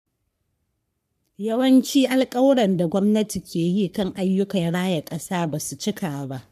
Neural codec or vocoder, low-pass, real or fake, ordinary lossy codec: codec, 44.1 kHz, 3.4 kbps, Pupu-Codec; 14.4 kHz; fake; none